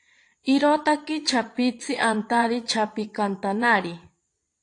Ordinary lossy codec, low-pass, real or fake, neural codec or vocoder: AAC, 48 kbps; 9.9 kHz; fake; vocoder, 22.05 kHz, 80 mel bands, Vocos